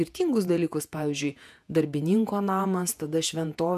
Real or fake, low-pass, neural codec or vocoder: fake; 14.4 kHz; vocoder, 48 kHz, 128 mel bands, Vocos